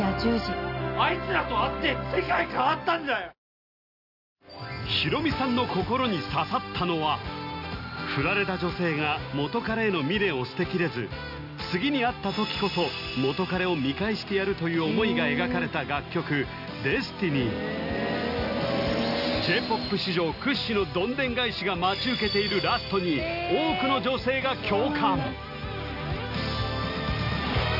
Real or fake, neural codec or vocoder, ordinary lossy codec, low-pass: real; none; none; 5.4 kHz